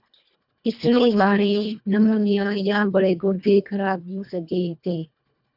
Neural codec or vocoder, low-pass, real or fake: codec, 24 kHz, 1.5 kbps, HILCodec; 5.4 kHz; fake